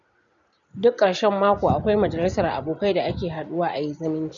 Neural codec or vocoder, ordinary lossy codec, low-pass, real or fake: none; none; 7.2 kHz; real